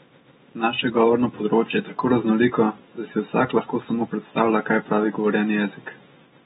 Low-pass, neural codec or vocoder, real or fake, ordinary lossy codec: 19.8 kHz; none; real; AAC, 16 kbps